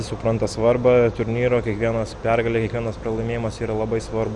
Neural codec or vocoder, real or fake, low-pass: none; real; 10.8 kHz